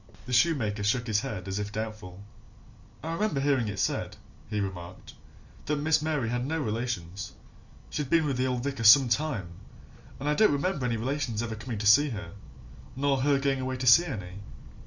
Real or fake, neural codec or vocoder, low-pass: real; none; 7.2 kHz